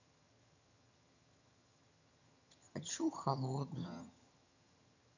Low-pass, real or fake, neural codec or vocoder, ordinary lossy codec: 7.2 kHz; fake; vocoder, 22.05 kHz, 80 mel bands, HiFi-GAN; none